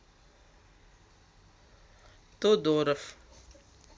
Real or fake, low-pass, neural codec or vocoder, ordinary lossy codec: real; none; none; none